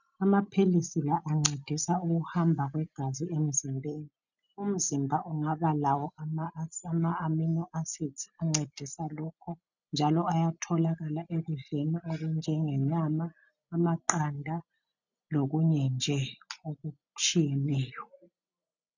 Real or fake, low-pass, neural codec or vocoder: real; 7.2 kHz; none